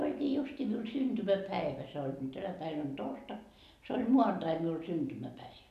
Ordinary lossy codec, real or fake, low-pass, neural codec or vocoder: none; real; 14.4 kHz; none